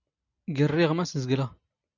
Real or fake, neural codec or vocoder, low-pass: real; none; 7.2 kHz